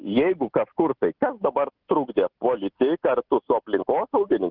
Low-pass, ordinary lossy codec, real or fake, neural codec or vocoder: 5.4 kHz; Opus, 16 kbps; real; none